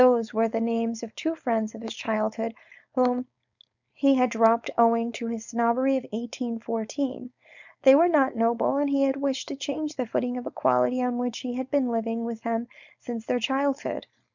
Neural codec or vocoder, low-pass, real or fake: codec, 16 kHz, 4.8 kbps, FACodec; 7.2 kHz; fake